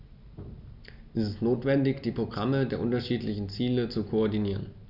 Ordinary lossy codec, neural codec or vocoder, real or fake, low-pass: none; none; real; 5.4 kHz